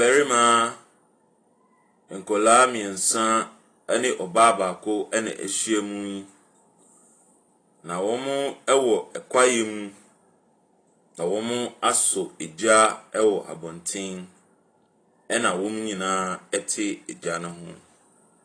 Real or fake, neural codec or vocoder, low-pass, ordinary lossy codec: real; none; 9.9 kHz; AAC, 32 kbps